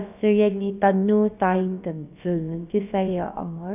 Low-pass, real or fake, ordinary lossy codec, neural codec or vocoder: 3.6 kHz; fake; none; codec, 16 kHz, about 1 kbps, DyCAST, with the encoder's durations